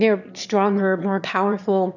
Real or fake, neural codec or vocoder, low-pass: fake; autoencoder, 22.05 kHz, a latent of 192 numbers a frame, VITS, trained on one speaker; 7.2 kHz